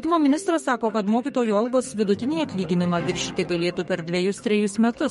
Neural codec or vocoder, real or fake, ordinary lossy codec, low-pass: codec, 32 kHz, 1.9 kbps, SNAC; fake; MP3, 48 kbps; 14.4 kHz